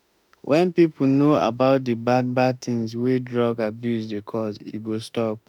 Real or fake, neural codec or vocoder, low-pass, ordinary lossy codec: fake; autoencoder, 48 kHz, 32 numbers a frame, DAC-VAE, trained on Japanese speech; 19.8 kHz; none